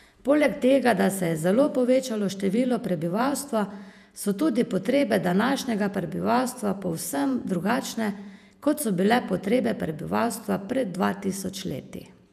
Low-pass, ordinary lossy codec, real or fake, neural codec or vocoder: 14.4 kHz; none; fake; vocoder, 48 kHz, 128 mel bands, Vocos